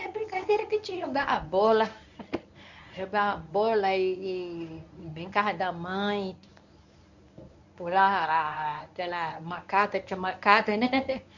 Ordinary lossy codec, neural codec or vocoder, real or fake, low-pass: none; codec, 24 kHz, 0.9 kbps, WavTokenizer, medium speech release version 1; fake; 7.2 kHz